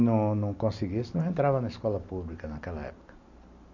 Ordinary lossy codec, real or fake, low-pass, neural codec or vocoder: none; real; 7.2 kHz; none